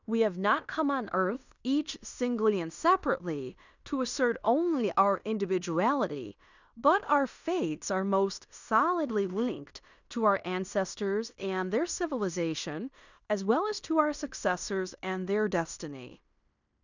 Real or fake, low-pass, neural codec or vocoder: fake; 7.2 kHz; codec, 16 kHz in and 24 kHz out, 0.9 kbps, LongCat-Audio-Codec, fine tuned four codebook decoder